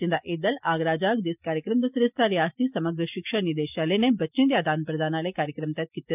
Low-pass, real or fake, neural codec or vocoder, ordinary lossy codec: 3.6 kHz; real; none; none